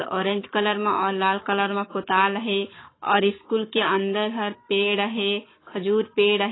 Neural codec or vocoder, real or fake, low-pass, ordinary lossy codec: codec, 44.1 kHz, 7.8 kbps, Pupu-Codec; fake; 7.2 kHz; AAC, 16 kbps